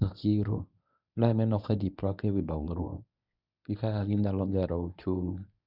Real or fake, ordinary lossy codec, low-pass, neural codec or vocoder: fake; none; 5.4 kHz; codec, 24 kHz, 0.9 kbps, WavTokenizer, medium speech release version 1